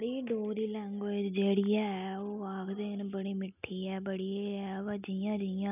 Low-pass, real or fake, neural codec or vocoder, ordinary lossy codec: 3.6 kHz; real; none; none